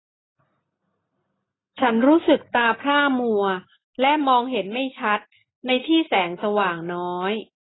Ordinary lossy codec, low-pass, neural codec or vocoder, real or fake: AAC, 16 kbps; 7.2 kHz; none; real